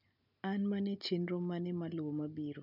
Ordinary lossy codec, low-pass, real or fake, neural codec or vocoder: none; 5.4 kHz; real; none